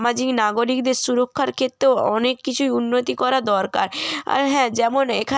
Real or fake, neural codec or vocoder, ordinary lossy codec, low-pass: real; none; none; none